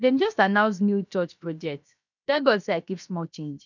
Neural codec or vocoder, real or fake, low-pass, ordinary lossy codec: codec, 16 kHz, 0.7 kbps, FocalCodec; fake; 7.2 kHz; none